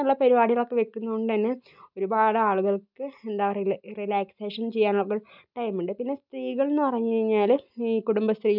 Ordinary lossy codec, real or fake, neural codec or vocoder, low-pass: none; real; none; 5.4 kHz